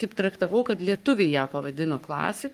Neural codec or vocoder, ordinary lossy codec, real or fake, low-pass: codec, 44.1 kHz, 3.4 kbps, Pupu-Codec; Opus, 32 kbps; fake; 14.4 kHz